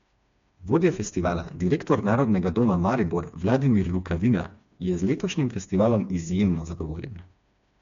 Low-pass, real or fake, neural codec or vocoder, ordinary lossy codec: 7.2 kHz; fake; codec, 16 kHz, 2 kbps, FreqCodec, smaller model; MP3, 64 kbps